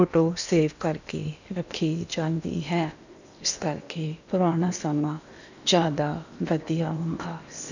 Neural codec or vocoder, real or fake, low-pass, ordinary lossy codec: codec, 16 kHz in and 24 kHz out, 0.8 kbps, FocalCodec, streaming, 65536 codes; fake; 7.2 kHz; none